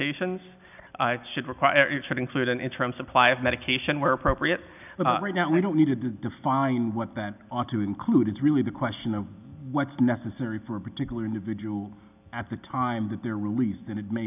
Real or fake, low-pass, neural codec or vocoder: real; 3.6 kHz; none